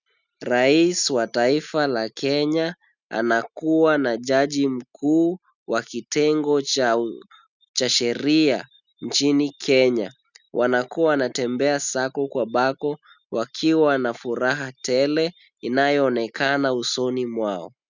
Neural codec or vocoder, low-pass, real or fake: none; 7.2 kHz; real